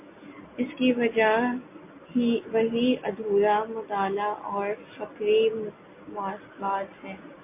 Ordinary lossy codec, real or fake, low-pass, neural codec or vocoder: MP3, 24 kbps; real; 3.6 kHz; none